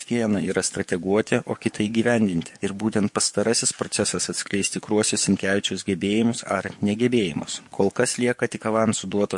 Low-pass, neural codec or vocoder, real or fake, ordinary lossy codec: 10.8 kHz; codec, 44.1 kHz, 7.8 kbps, DAC; fake; MP3, 48 kbps